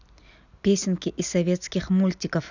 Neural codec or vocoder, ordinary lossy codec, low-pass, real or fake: none; none; 7.2 kHz; real